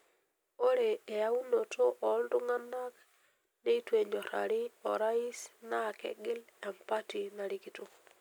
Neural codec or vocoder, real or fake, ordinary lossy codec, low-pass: none; real; none; none